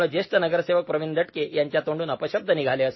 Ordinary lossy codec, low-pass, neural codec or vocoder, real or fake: MP3, 24 kbps; 7.2 kHz; none; real